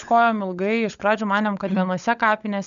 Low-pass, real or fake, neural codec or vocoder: 7.2 kHz; fake; codec, 16 kHz, 16 kbps, FunCodec, trained on LibriTTS, 50 frames a second